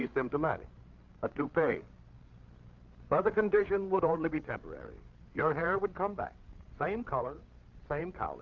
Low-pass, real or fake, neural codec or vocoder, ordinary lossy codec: 7.2 kHz; fake; codec, 16 kHz, 8 kbps, FreqCodec, larger model; Opus, 24 kbps